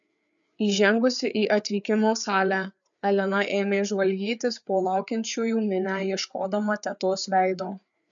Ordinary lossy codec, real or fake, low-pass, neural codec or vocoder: MP3, 96 kbps; fake; 7.2 kHz; codec, 16 kHz, 4 kbps, FreqCodec, larger model